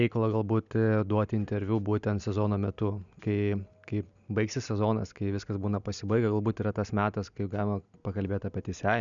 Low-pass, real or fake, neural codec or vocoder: 7.2 kHz; real; none